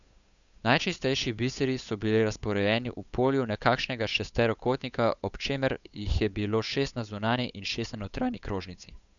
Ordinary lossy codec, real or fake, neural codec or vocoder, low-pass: none; fake; codec, 16 kHz, 8 kbps, FunCodec, trained on Chinese and English, 25 frames a second; 7.2 kHz